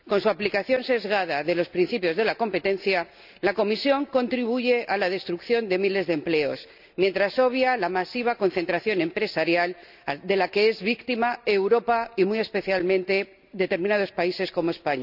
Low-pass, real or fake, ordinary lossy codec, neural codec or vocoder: 5.4 kHz; real; none; none